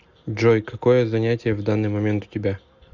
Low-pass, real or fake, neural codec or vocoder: 7.2 kHz; real; none